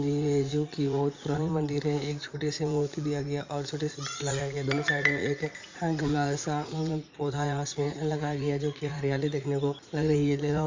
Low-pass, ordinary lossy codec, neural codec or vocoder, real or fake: 7.2 kHz; MP3, 64 kbps; vocoder, 44.1 kHz, 80 mel bands, Vocos; fake